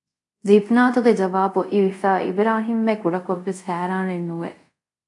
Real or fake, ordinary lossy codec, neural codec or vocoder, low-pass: fake; AAC, 64 kbps; codec, 24 kHz, 0.5 kbps, DualCodec; 10.8 kHz